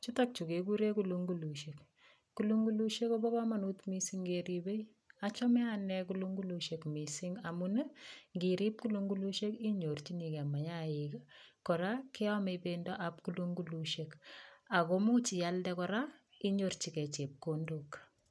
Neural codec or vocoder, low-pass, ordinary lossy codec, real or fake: none; none; none; real